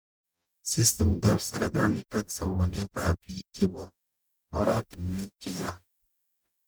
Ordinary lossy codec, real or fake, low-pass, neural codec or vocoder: none; fake; none; codec, 44.1 kHz, 0.9 kbps, DAC